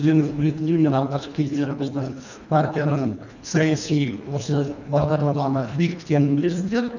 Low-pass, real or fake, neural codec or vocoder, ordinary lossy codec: 7.2 kHz; fake; codec, 24 kHz, 1.5 kbps, HILCodec; none